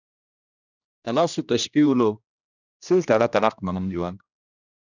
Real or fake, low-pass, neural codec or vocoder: fake; 7.2 kHz; codec, 16 kHz, 1 kbps, X-Codec, HuBERT features, trained on general audio